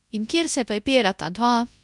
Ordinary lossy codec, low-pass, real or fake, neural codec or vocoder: none; 10.8 kHz; fake; codec, 24 kHz, 0.9 kbps, WavTokenizer, large speech release